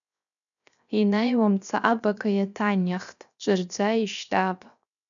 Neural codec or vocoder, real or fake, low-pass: codec, 16 kHz, 0.7 kbps, FocalCodec; fake; 7.2 kHz